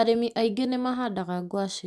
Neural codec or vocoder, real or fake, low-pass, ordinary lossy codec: none; real; none; none